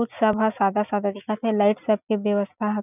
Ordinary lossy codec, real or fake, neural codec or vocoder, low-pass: none; real; none; 3.6 kHz